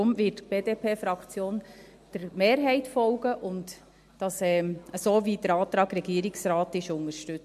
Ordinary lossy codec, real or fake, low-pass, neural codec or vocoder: MP3, 64 kbps; real; 14.4 kHz; none